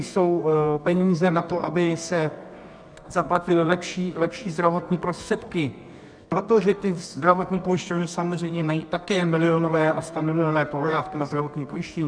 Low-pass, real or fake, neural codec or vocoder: 9.9 kHz; fake; codec, 24 kHz, 0.9 kbps, WavTokenizer, medium music audio release